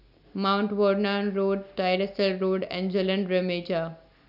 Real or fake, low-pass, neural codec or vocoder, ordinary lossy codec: real; 5.4 kHz; none; none